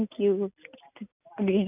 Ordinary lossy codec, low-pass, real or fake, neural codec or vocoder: none; 3.6 kHz; real; none